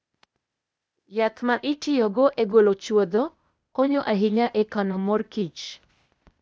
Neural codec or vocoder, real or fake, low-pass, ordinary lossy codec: codec, 16 kHz, 0.8 kbps, ZipCodec; fake; none; none